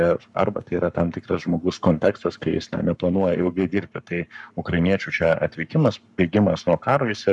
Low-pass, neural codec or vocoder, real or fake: 10.8 kHz; codec, 44.1 kHz, 7.8 kbps, Pupu-Codec; fake